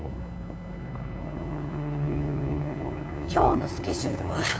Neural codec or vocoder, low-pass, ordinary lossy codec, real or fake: codec, 16 kHz, 2 kbps, FunCodec, trained on LibriTTS, 25 frames a second; none; none; fake